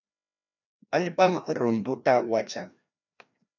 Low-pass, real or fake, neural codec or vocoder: 7.2 kHz; fake; codec, 16 kHz, 1 kbps, FreqCodec, larger model